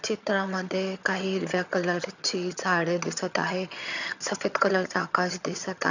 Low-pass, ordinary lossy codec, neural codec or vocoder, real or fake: 7.2 kHz; none; vocoder, 22.05 kHz, 80 mel bands, HiFi-GAN; fake